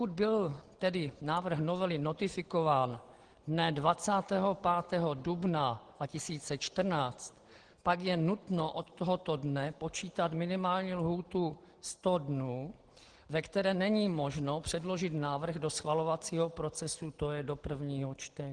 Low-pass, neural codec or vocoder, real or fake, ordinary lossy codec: 9.9 kHz; none; real; Opus, 16 kbps